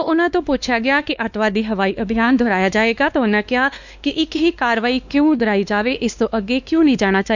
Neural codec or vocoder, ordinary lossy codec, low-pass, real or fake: codec, 16 kHz, 2 kbps, X-Codec, WavLM features, trained on Multilingual LibriSpeech; none; 7.2 kHz; fake